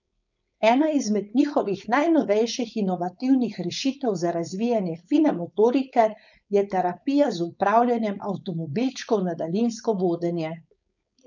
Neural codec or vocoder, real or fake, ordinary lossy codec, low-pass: codec, 16 kHz, 4.8 kbps, FACodec; fake; none; 7.2 kHz